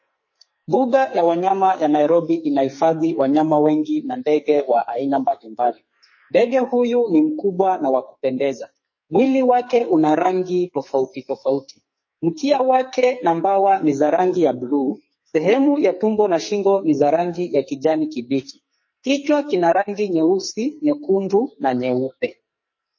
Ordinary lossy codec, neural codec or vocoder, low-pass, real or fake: MP3, 32 kbps; codec, 44.1 kHz, 2.6 kbps, SNAC; 7.2 kHz; fake